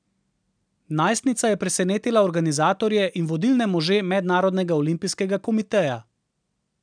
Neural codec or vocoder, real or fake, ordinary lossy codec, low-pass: none; real; none; 9.9 kHz